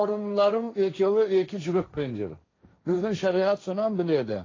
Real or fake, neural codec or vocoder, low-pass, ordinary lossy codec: fake; codec, 16 kHz, 1.1 kbps, Voila-Tokenizer; 7.2 kHz; AAC, 32 kbps